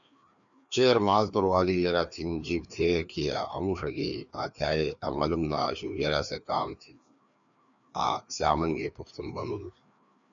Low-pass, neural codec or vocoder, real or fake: 7.2 kHz; codec, 16 kHz, 2 kbps, FreqCodec, larger model; fake